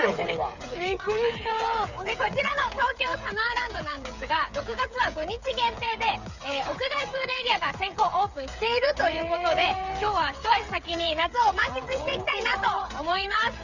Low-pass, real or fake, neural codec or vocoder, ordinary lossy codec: 7.2 kHz; fake; codec, 16 kHz, 8 kbps, FreqCodec, smaller model; none